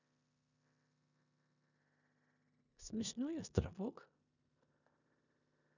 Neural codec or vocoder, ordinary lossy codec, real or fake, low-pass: codec, 16 kHz in and 24 kHz out, 0.9 kbps, LongCat-Audio-Codec, four codebook decoder; none; fake; 7.2 kHz